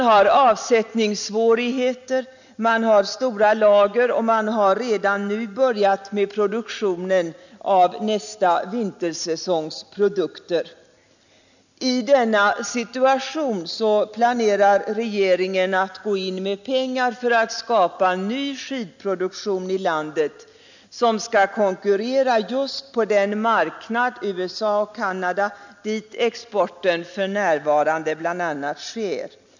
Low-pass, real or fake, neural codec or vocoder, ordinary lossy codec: 7.2 kHz; real; none; none